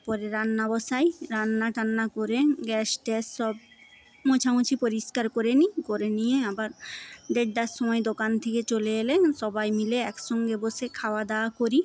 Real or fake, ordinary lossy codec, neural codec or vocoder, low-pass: real; none; none; none